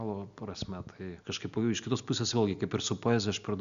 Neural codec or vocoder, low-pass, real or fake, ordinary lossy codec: none; 7.2 kHz; real; MP3, 96 kbps